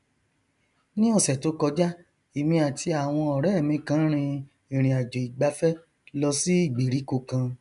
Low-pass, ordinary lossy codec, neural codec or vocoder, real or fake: 10.8 kHz; none; none; real